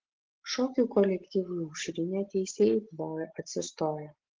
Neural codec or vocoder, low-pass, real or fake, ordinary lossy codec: none; 7.2 kHz; real; Opus, 16 kbps